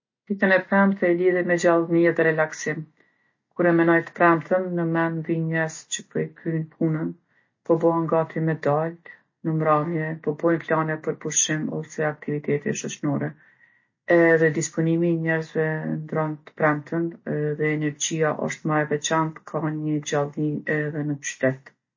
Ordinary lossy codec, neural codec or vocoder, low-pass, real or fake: MP3, 32 kbps; none; 7.2 kHz; real